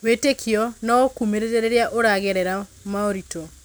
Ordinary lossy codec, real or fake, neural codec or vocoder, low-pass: none; real; none; none